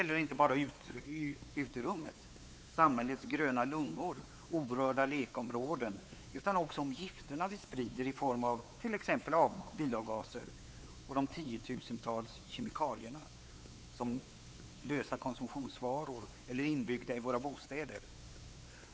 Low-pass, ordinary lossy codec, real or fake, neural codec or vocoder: none; none; fake; codec, 16 kHz, 4 kbps, X-Codec, WavLM features, trained on Multilingual LibriSpeech